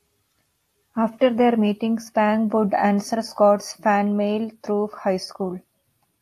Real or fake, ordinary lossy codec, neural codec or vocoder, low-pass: real; AAC, 64 kbps; none; 14.4 kHz